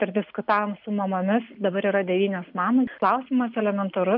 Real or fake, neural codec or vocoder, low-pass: real; none; 5.4 kHz